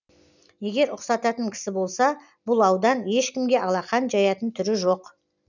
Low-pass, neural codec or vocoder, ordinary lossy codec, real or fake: 7.2 kHz; none; none; real